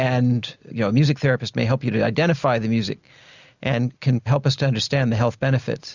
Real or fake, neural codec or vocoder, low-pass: real; none; 7.2 kHz